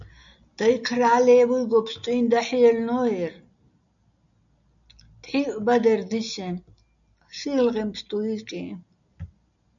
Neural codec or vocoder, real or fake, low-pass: none; real; 7.2 kHz